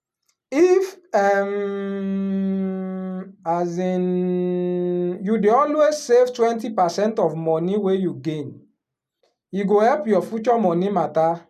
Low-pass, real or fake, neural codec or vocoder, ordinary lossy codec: 14.4 kHz; real; none; none